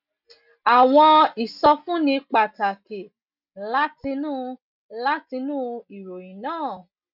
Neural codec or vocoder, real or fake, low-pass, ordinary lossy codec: none; real; 5.4 kHz; none